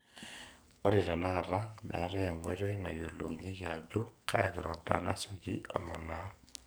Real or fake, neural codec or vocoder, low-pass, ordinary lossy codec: fake; codec, 44.1 kHz, 2.6 kbps, SNAC; none; none